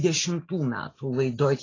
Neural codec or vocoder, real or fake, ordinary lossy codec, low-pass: none; real; AAC, 32 kbps; 7.2 kHz